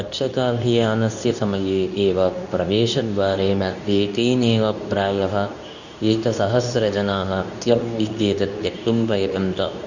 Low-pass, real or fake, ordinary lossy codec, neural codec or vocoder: 7.2 kHz; fake; none; codec, 24 kHz, 0.9 kbps, WavTokenizer, medium speech release version 2